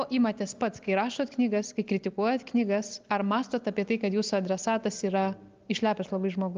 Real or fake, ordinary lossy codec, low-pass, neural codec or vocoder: real; Opus, 24 kbps; 7.2 kHz; none